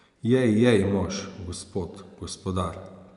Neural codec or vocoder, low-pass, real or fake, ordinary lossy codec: vocoder, 24 kHz, 100 mel bands, Vocos; 10.8 kHz; fake; none